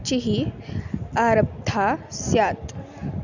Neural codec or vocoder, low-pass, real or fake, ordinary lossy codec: none; 7.2 kHz; real; none